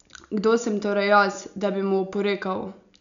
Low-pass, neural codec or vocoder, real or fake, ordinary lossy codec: 7.2 kHz; none; real; none